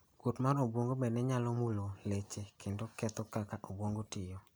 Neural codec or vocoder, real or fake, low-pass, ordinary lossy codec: none; real; none; none